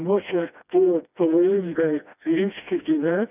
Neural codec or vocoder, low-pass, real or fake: codec, 16 kHz, 1 kbps, FreqCodec, smaller model; 3.6 kHz; fake